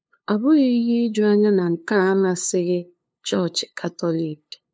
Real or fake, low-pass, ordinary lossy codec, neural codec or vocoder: fake; none; none; codec, 16 kHz, 2 kbps, FunCodec, trained on LibriTTS, 25 frames a second